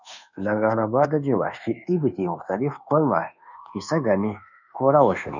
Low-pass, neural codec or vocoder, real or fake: 7.2 kHz; codec, 24 kHz, 1.2 kbps, DualCodec; fake